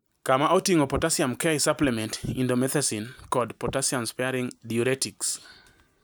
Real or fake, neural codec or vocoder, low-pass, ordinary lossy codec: real; none; none; none